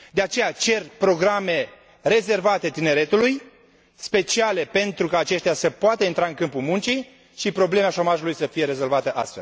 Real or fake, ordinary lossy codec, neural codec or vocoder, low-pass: real; none; none; none